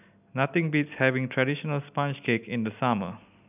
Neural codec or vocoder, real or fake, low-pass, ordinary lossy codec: none; real; 3.6 kHz; none